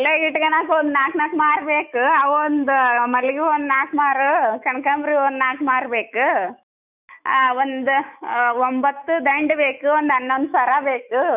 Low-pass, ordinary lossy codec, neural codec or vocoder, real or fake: 3.6 kHz; none; autoencoder, 48 kHz, 128 numbers a frame, DAC-VAE, trained on Japanese speech; fake